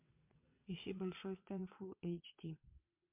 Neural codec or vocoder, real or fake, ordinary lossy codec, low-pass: vocoder, 22.05 kHz, 80 mel bands, WaveNeXt; fake; MP3, 32 kbps; 3.6 kHz